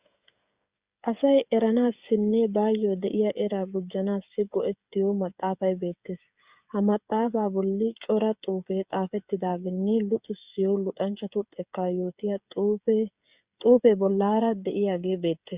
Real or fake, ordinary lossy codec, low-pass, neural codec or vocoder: fake; Opus, 64 kbps; 3.6 kHz; codec, 16 kHz, 8 kbps, FreqCodec, smaller model